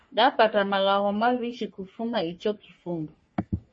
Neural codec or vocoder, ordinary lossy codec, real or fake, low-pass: codec, 44.1 kHz, 3.4 kbps, Pupu-Codec; MP3, 32 kbps; fake; 9.9 kHz